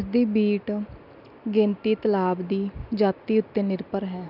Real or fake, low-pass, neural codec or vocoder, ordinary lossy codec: real; 5.4 kHz; none; none